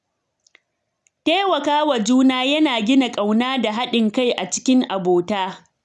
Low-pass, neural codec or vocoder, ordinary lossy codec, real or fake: none; none; none; real